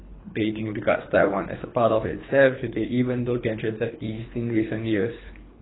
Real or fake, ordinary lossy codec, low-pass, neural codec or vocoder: fake; AAC, 16 kbps; 7.2 kHz; codec, 24 kHz, 3 kbps, HILCodec